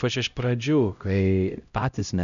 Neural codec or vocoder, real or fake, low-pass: codec, 16 kHz, 0.5 kbps, X-Codec, HuBERT features, trained on LibriSpeech; fake; 7.2 kHz